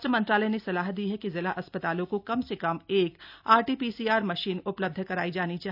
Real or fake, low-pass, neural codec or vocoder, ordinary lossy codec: real; 5.4 kHz; none; none